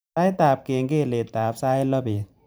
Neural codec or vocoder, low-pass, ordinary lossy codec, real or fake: vocoder, 44.1 kHz, 128 mel bands every 512 samples, BigVGAN v2; none; none; fake